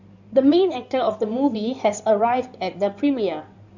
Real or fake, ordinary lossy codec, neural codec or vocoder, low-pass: fake; none; codec, 16 kHz in and 24 kHz out, 2.2 kbps, FireRedTTS-2 codec; 7.2 kHz